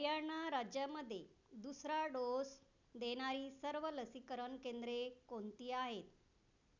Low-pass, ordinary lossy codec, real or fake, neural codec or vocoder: 7.2 kHz; none; real; none